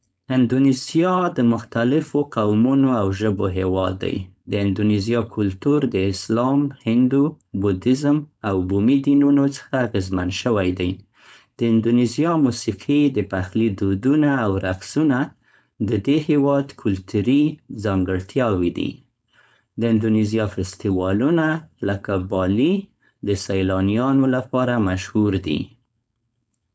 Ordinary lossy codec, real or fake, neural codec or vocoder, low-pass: none; fake; codec, 16 kHz, 4.8 kbps, FACodec; none